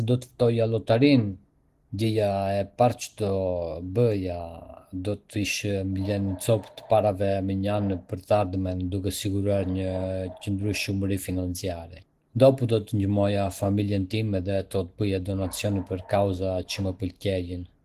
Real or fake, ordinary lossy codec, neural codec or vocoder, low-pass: real; Opus, 16 kbps; none; 14.4 kHz